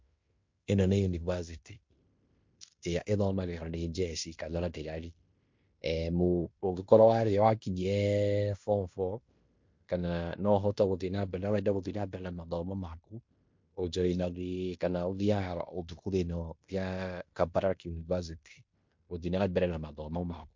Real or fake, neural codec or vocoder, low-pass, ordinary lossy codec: fake; codec, 16 kHz in and 24 kHz out, 0.9 kbps, LongCat-Audio-Codec, fine tuned four codebook decoder; 7.2 kHz; MP3, 48 kbps